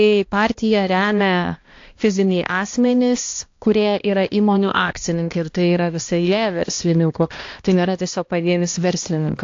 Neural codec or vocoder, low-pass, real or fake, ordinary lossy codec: codec, 16 kHz, 1 kbps, X-Codec, HuBERT features, trained on balanced general audio; 7.2 kHz; fake; AAC, 48 kbps